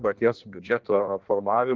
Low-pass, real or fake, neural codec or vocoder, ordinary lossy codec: 7.2 kHz; fake; codec, 16 kHz in and 24 kHz out, 1.1 kbps, FireRedTTS-2 codec; Opus, 16 kbps